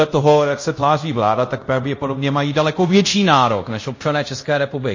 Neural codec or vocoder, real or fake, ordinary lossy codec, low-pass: codec, 24 kHz, 0.5 kbps, DualCodec; fake; MP3, 32 kbps; 7.2 kHz